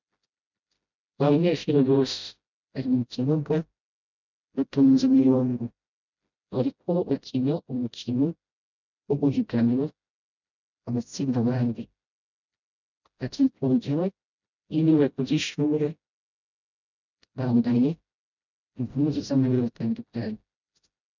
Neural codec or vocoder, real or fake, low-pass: codec, 16 kHz, 0.5 kbps, FreqCodec, smaller model; fake; 7.2 kHz